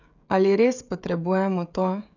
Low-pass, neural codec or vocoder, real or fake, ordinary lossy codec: 7.2 kHz; codec, 16 kHz, 16 kbps, FreqCodec, smaller model; fake; none